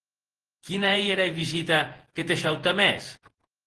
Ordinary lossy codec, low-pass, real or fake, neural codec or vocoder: Opus, 16 kbps; 10.8 kHz; fake; vocoder, 48 kHz, 128 mel bands, Vocos